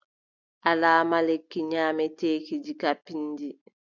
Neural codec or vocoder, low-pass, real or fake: none; 7.2 kHz; real